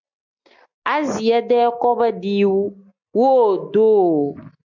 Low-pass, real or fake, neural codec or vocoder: 7.2 kHz; real; none